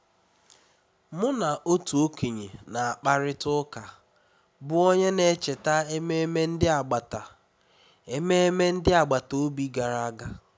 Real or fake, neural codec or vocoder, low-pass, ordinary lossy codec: real; none; none; none